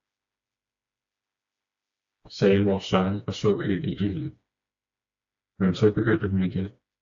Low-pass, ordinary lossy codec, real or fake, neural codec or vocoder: 7.2 kHz; AAC, 64 kbps; fake; codec, 16 kHz, 2 kbps, FreqCodec, smaller model